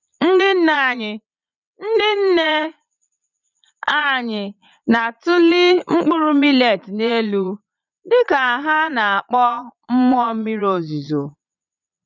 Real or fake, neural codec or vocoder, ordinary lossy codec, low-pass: fake; vocoder, 22.05 kHz, 80 mel bands, Vocos; none; 7.2 kHz